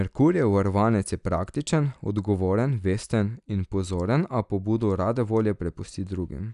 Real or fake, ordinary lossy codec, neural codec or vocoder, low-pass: real; none; none; 10.8 kHz